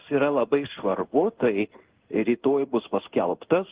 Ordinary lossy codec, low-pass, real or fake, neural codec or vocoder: Opus, 16 kbps; 3.6 kHz; fake; codec, 16 kHz in and 24 kHz out, 1 kbps, XY-Tokenizer